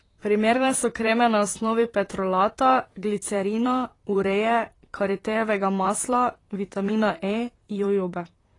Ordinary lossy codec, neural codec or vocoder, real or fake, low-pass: AAC, 32 kbps; vocoder, 44.1 kHz, 128 mel bands, Pupu-Vocoder; fake; 10.8 kHz